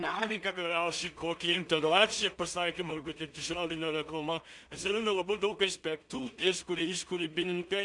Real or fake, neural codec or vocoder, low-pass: fake; codec, 16 kHz in and 24 kHz out, 0.4 kbps, LongCat-Audio-Codec, two codebook decoder; 10.8 kHz